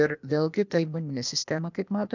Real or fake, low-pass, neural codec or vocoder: fake; 7.2 kHz; codec, 16 kHz, 0.8 kbps, ZipCodec